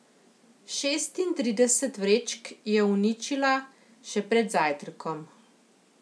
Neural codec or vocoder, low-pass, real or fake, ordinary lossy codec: none; none; real; none